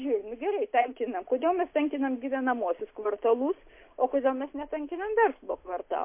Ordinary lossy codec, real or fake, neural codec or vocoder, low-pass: MP3, 24 kbps; real; none; 3.6 kHz